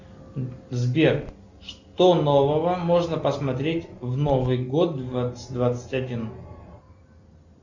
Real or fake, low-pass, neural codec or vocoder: real; 7.2 kHz; none